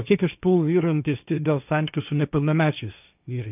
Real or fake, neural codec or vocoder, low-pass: fake; codec, 16 kHz, 1.1 kbps, Voila-Tokenizer; 3.6 kHz